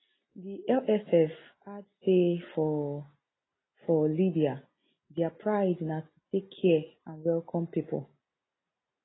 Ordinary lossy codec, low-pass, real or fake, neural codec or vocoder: AAC, 16 kbps; 7.2 kHz; real; none